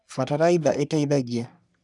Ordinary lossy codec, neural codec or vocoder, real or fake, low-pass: none; codec, 44.1 kHz, 3.4 kbps, Pupu-Codec; fake; 10.8 kHz